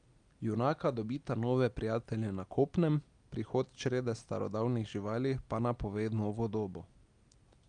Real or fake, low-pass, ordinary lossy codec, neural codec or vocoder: real; 9.9 kHz; Opus, 32 kbps; none